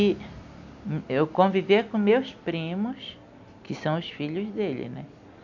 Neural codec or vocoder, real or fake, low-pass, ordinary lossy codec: none; real; 7.2 kHz; none